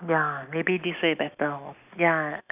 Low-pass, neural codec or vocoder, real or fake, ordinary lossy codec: 3.6 kHz; none; real; none